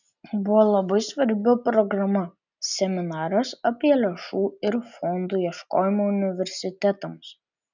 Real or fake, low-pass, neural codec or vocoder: real; 7.2 kHz; none